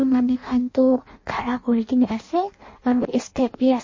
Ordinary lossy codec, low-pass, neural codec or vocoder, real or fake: MP3, 32 kbps; 7.2 kHz; codec, 16 kHz in and 24 kHz out, 0.6 kbps, FireRedTTS-2 codec; fake